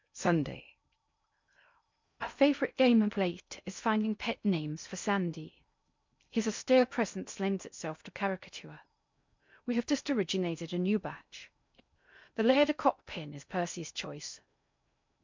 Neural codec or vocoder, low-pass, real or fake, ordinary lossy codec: codec, 16 kHz in and 24 kHz out, 0.6 kbps, FocalCodec, streaming, 2048 codes; 7.2 kHz; fake; MP3, 64 kbps